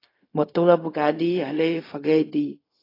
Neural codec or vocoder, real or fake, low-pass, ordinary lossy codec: codec, 16 kHz, 0.4 kbps, LongCat-Audio-Codec; fake; 5.4 kHz; AAC, 24 kbps